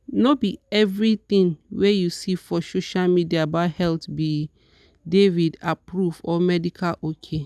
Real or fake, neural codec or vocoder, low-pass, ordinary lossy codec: real; none; none; none